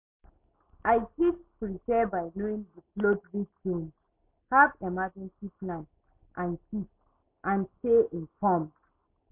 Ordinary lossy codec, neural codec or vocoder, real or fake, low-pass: MP3, 32 kbps; none; real; 3.6 kHz